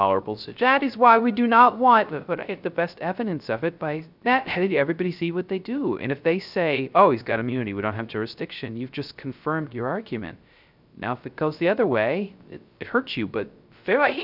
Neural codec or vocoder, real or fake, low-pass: codec, 16 kHz, 0.3 kbps, FocalCodec; fake; 5.4 kHz